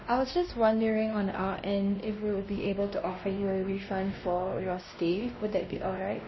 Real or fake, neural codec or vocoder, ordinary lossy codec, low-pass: fake; codec, 16 kHz, 1 kbps, X-Codec, WavLM features, trained on Multilingual LibriSpeech; MP3, 24 kbps; 7.2 kHz